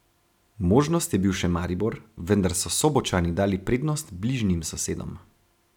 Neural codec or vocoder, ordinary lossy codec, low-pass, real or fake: none; none; 19.8 kHz; real